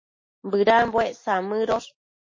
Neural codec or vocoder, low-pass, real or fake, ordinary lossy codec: none; 7.2 kHz; real; MP3, 32 kbps